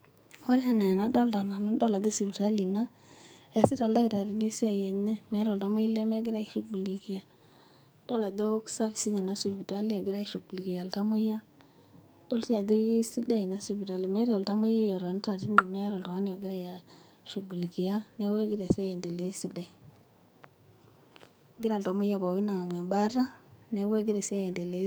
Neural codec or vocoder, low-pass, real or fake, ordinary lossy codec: codec, 44.1 kHz, 2.6 kbps, SNAC; none; fake; none